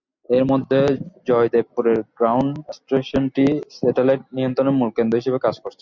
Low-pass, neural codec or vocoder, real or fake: 7.2 kHz; none; real